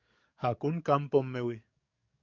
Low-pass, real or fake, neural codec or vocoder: 7.2 kHz; fake; codec, 44.1 kHz, 7.8 kbps, DAC